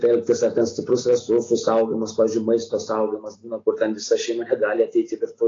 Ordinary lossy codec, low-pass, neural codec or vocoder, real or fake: AAC, 32 kbps; 7.2 kHz; none; real